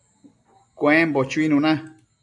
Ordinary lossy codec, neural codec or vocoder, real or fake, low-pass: AAC, 64 kbps; none; real; 10.8 kHz